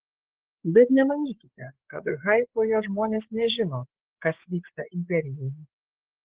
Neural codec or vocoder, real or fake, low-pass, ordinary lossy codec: codec, 16 kHz, 8 kbps, FreqCodec, larger model; fake; 3.6 kHz; Opus, 16 kbps